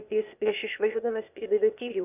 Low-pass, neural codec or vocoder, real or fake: 3.6 kHz; codec, 16 kHz, 0.8 kbps, ZipCodec; fake